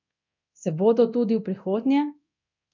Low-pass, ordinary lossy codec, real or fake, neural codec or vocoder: 7.2 kHz; MP3, 64 kbps; fake; codec, 24 kHz, 0.9 kbps, DualCodec